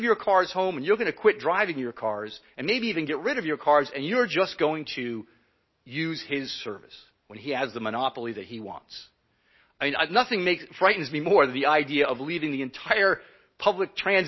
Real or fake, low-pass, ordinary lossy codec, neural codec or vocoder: real; 7.2 kHz; MP3, 24 kbps; none